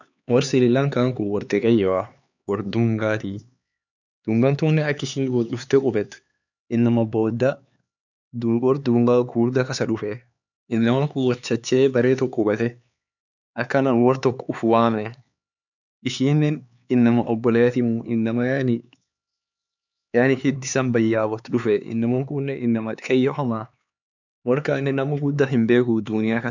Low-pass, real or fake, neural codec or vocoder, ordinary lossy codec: 7.2 kHz; fake; codec, 16 kHz, 4 kbps, X-Codec, HuBERT features, trained on LibriSpeech; none